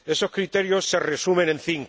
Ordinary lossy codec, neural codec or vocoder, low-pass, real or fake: none; none; none; real